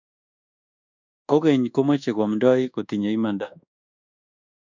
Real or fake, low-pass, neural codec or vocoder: fake; 7.2 kHz; codec, 24 kHz, 1.2 kbps, DualCodec